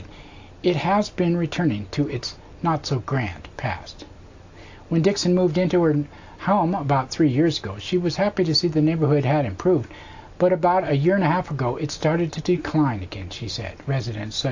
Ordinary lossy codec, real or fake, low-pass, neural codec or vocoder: AAC, 48 kbps; real; 7.2 kHz; none